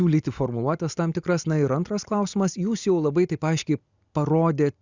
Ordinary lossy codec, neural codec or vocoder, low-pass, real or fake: Opus, 64 kbps; none; 7.2 kHz; real